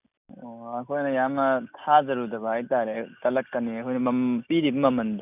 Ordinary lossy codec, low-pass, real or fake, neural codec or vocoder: none; 3.6 kHz; real; none